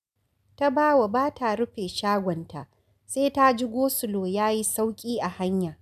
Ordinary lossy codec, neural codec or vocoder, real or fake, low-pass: none; none; real; 14.4 kHz